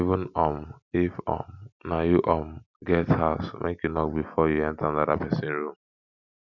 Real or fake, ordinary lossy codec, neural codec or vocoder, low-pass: real; none; none; 7.2 kHz